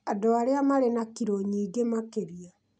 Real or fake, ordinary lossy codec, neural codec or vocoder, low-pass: real; none; none; 9.9 kHz